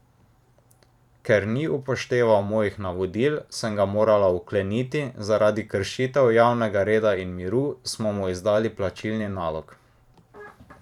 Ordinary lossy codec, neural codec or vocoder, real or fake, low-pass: none; vocoder, 44.1 kHz, 128 mel bands every 512 samples, BigVGAN v2; fake; 19.8 kHz